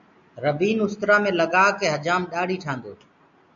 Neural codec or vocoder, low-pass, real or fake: none; 7.2 kHz; real